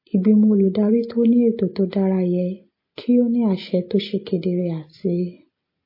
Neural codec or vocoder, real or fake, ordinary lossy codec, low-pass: none; real; MP3, 24 kbps; 5.4 kHz